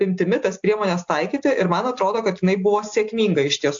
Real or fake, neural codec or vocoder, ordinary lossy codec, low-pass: real; none; AAC, 64 kbps; 7.2 kHz